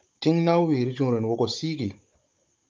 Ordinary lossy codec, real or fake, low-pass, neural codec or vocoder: Opus, 24 kbps; fake; 7.2 kHz; codec, 16 kHz, 16 kbps, FunCodec, trained on Chinese and English, 50 frames a second